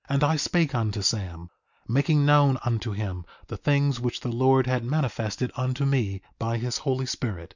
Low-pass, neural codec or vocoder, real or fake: 7.2 kHz; none; real